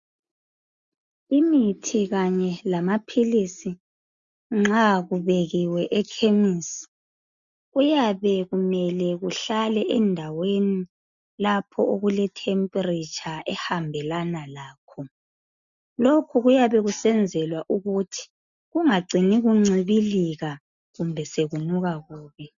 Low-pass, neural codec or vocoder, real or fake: 7.2 kHz; none; real